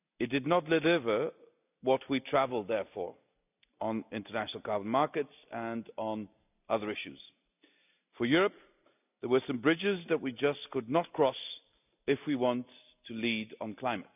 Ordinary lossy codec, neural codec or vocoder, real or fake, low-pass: none; none; real; 3.6 kHz